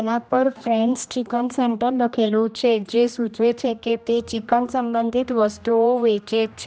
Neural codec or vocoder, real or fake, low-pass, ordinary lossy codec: codec, 16 kHz, 1 kbps, X-Codec, HuBERT features, trained on general audio; fake; none; none